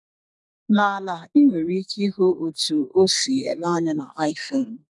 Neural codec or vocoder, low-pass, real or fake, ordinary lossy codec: codec, 32 kHz, 1.9 kbps, SNAC; 10.8 kHz; fake; none